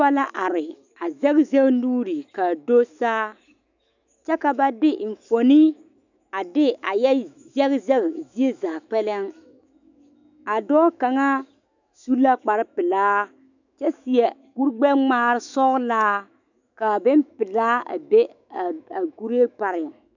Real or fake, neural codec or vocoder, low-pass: fake; autoencoder, 48 kHz, 128 numbers a frame, DAC-VAE, trained on Japanese speech; 7.2 kHz